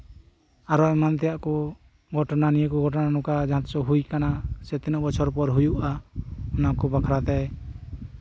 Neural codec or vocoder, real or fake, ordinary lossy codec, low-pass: none; real; none; none